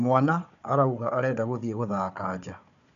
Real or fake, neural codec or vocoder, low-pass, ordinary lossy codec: fake; codec, 16 kHz, 4 kbps, FunCodec, trained on Chinese and English, 50 frames a second; 7.2 kHz; none